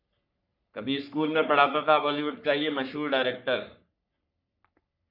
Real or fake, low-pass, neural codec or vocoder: fake; 5.4 kHz; codec, 44.1 kHz, 3.4 kbps, Pupu-Codec